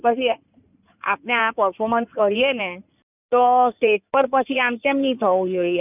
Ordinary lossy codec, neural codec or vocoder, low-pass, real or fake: none; codec, 16 kHz in and 24 kHz out, 2.2 kbps, FireRedTTS-2 codec; 3.6 kHz; fake